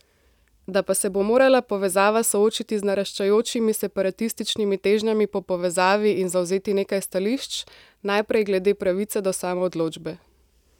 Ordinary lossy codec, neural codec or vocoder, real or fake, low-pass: none; none; real; 19.8 kHz